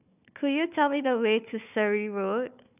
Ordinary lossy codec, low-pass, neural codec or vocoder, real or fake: none; 3.6 kHz; codec, 16 kHz, 4 kbps, FunCodec, trained on Chinese and English, 50 frames a second; fake